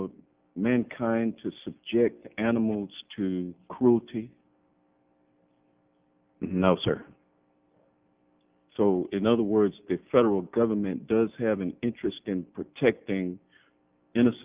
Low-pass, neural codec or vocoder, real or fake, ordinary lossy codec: 3.6 kHz; none; real; Opus, 32 kbps